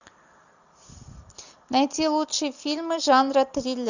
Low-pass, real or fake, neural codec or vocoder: 7.2 kHz; real; none